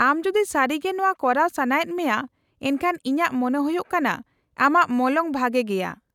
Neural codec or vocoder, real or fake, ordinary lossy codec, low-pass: none; real; none; 19.8 kHz